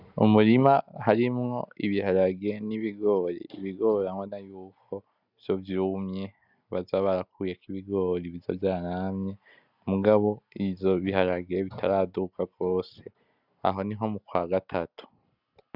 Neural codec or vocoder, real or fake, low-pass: none; real; 5.4 kHz